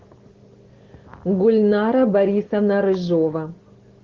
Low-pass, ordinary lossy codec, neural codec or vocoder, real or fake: 7.2 kHz; Opus, 16 kbps; none; real